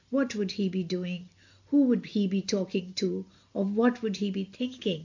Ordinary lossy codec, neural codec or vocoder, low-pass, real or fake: AAC, 48 kbps; none; 7.2 kHz; real